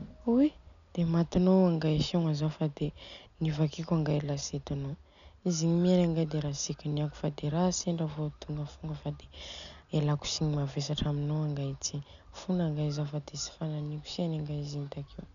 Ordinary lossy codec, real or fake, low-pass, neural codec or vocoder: none; real; 7.2 kHz; none